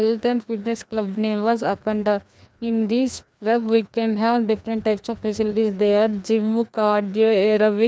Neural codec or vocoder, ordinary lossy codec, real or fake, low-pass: codec, 16 kHz, 2 kbps, FreqCodec, larger model; none; fake; none